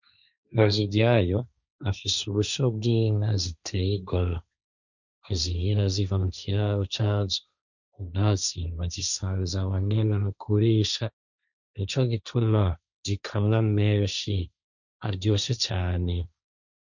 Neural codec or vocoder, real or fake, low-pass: codec, 16 kHz, 1.1 kbps, Voila-Tokenizer; fake; 7.2 kHz